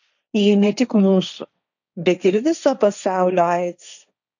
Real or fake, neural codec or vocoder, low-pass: fake; codec, 16 kHz, 1.1 kbps, Voila-Tokenizer; 7.2 kHz